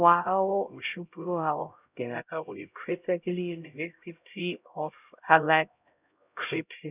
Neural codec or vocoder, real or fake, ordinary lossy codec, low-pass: codec, 16 kHz, 0.5 kbps, X-Codec, HuBERT features, trained on LibriSpeech; fake; none; 3.6 kHz